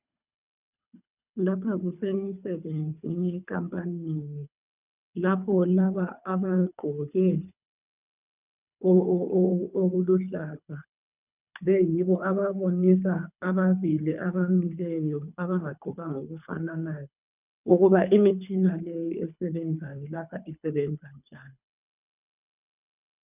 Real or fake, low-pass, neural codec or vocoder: fake; 3.6 kHz; codec, 24 kHz, 3 kbps, HILCodec